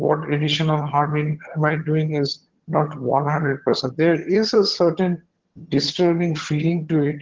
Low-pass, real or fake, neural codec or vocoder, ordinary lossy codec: 7.2 kHz; fake; vocoder, 22.05 kHz, 80 mel bands, HiFi-GAN; Opus, 16 kbps